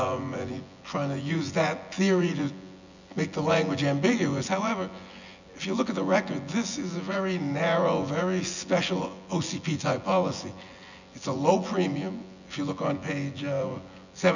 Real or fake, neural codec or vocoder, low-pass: fake; vocoder, 24 kHz, 100 mel bands, Vocos; 7.2 kHz